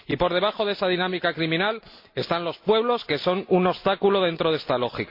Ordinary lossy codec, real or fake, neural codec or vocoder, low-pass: MP3, 32 kbps; real; none; 5.4 kHz